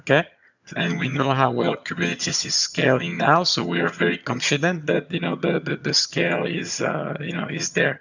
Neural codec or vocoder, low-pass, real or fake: vocoder, 22.05 kHz, 80 mel bands, HiFi-GAN; 7.2 kHz; fake